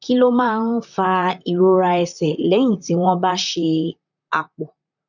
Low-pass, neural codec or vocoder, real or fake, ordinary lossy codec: 7.2 kHz; vocoder, 44.1 kHz, 128 mel bands, Pupu-Vocoder; fake; none